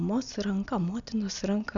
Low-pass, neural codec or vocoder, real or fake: 7.2 kHz; none; real